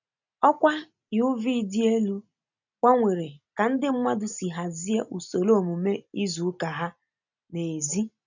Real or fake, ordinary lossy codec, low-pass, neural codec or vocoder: real; none; 7.2 kHz; none